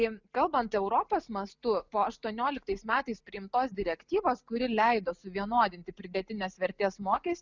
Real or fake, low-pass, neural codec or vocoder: real; 7.2 kHz; none